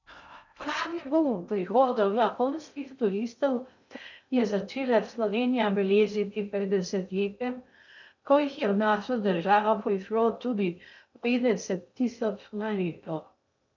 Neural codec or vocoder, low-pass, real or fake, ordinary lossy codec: codec, 16 kHz in and 24 kHz out, 0.6 kbps, FocalCodec, streaming, 2048 codes; 7.2 kHz; fake; none